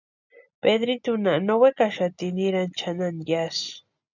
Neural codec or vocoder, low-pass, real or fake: none; 7.2 kHz; real